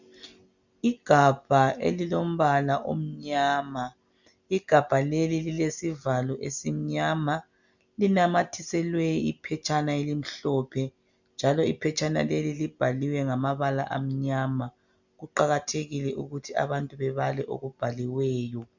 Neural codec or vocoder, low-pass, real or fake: none; 7.2 kHz; real